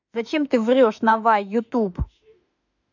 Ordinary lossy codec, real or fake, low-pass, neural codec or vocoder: none; fake; 7.2 kHz; autoencoder, 48 kHz, 32 numbers a frame, DAC-VAE, trained on Japanese speech